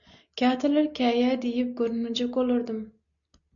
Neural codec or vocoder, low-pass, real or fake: none; 7.2 kHz; real